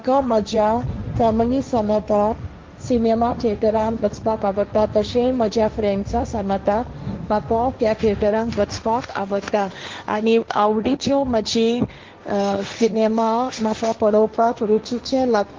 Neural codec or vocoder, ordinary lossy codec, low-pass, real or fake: codec, 16 kHz, 1.1 kbps, Voila-Tokenizer; Opus, 32 kbps; 7.2 kHz; fake